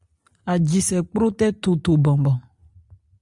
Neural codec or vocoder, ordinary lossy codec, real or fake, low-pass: none; Opus, 64 kbps; real; 10.8 kHz